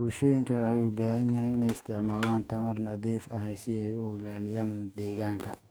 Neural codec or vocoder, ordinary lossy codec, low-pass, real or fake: codec, 44.1 kHz, 2.6 kbps, DAC; none; none; fake